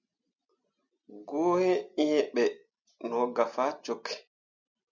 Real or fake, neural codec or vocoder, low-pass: real; none; 7.2 kHz